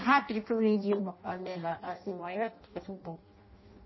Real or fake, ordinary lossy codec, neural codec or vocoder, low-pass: fake; MP3, 24 kbps; codec, 16 kHz in and 24 kHz out, 0.6 kbps, FireRedTTS-2 codec; 7.2 kHz